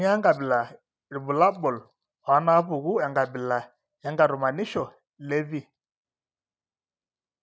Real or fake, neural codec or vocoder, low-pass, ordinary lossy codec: real; none; none; none